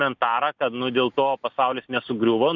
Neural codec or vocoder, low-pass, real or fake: none; 7.2 kHz; real